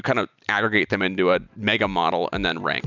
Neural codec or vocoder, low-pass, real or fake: none; 7.2 kHz; real